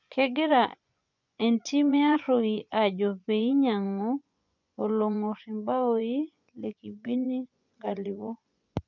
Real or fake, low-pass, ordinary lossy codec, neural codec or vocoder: fake; 7.2 kHz; none; vocoder, 24 kHz, 100 mel bands, Vocos